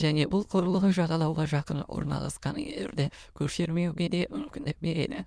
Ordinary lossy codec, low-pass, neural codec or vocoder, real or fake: none; none; autoencoder, 22.05 kHz, a latent of 192 numbers a frame, VITS, trained on many speakers; fake